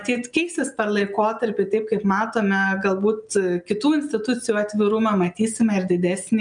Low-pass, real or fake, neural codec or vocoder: 9.9 kHz; real; none